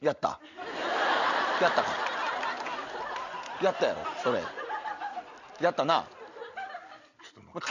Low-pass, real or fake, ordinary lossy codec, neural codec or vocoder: 7.2 kHz; real; none; none